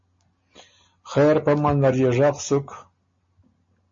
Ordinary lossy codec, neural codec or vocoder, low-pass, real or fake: MP3, 32 kbps; none; 7.2 kHz; real